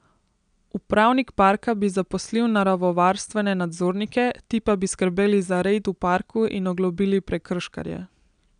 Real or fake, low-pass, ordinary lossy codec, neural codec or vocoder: real; 9.9 kHz; none; none